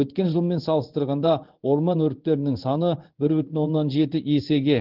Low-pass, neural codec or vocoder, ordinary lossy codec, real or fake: 5.4 kHz; codec, 16 kHz in and 24 kHz out, 1 kbps, XY-Tokenizer; Opus, 16 kbps; fake